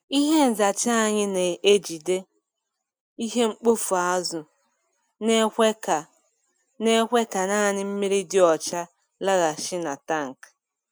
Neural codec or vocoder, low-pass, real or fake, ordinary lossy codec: none; none; real; none